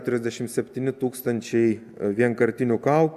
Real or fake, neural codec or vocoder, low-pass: real; none; 14.4 kHz